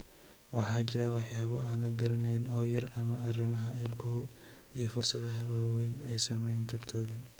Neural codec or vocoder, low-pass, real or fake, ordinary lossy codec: codec, 44.1 kHz, 2.6 kbps, SNAC; none; fake; none